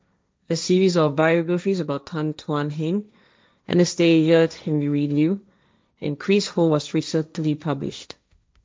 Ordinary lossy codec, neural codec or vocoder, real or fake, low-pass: none; codec, 16 kHz, 1.1 kbps, Voila-Tokenizer; fake; none